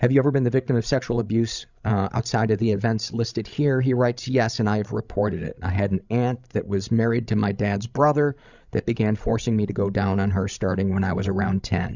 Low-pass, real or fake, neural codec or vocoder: 7.2 kHz; fake; codec, 16 kHz, 16 kbps, FreqCodec, larger model